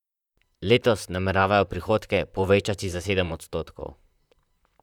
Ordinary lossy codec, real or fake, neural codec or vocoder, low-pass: none; fake; vocoder, 44.1 kHz, 128 mel bands, Pupu-Vocoder; 19.8 kHz